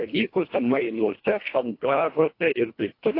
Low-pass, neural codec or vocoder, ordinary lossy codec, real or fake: 5.4 kHz; codec, 24 kHz, 1.5 kbps, HILCodec; AAC, 32 kbps; fake